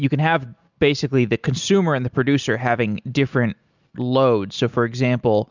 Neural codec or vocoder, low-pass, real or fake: none; 7.2 kHz; real